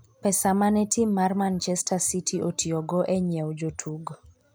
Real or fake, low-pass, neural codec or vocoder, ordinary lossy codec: real; none; none; none